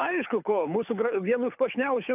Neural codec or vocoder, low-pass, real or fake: codec, 24 kHz, 6 kbps, HILCodec; 3.6 kHz; fake